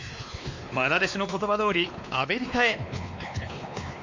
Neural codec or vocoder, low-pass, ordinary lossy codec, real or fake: codec, 16 kHz, 2 kbps, X-Codec, WavLM features, trained on Multilingual LibriSpeech; 7.2 kHz; MP3, 64 kbps; fake